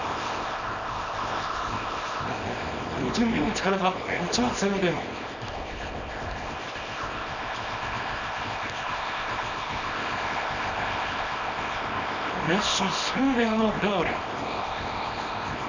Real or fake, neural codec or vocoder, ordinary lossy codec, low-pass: fake; codec, 24 kHz, 0.9 kbps, WavTokenizer, small release; none; 7.2 kHz